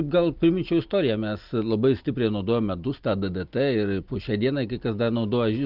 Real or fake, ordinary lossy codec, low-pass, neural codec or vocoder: real; Opus, 32 kbps; 5.4 kHz; none